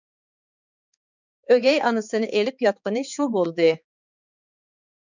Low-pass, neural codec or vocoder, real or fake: 7.2 kHz; codec, 16 kHz, 4 kbps, X-Codec, HuBERT features, trained on balanced general audio; fake